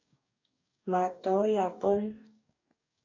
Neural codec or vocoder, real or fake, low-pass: codec, 44.1 kHz, 2.6 kbps, DAC; fake; 7.2 kHz